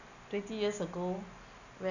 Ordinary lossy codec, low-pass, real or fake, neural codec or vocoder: none; 7.2 kHz; real; none